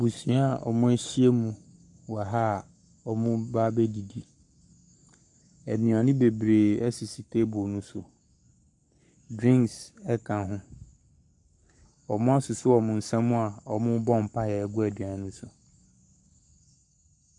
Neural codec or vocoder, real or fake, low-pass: codec, 44.1 kHz, 7.8 kbps, Pupu-Codec; fake; 10.8 kHz